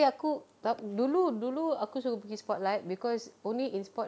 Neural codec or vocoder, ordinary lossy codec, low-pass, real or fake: none; none; none; real